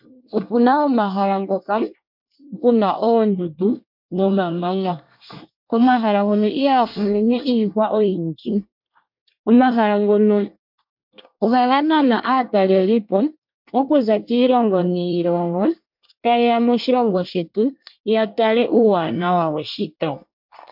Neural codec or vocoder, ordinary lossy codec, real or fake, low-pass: codec, 24 kHz, 1 kbps, SNAC; MP3, 48 kbps; fake; 5.4 kHz